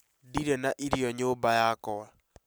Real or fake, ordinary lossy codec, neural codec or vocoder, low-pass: real; none; none; none